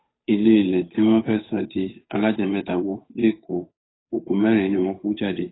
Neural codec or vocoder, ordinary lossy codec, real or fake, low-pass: codec, 16 kHz, 8 kbps, FunCodec, trained on Chinese and English, 25 frames a second; AAC, 16 kbps; fake; 7.2 kHz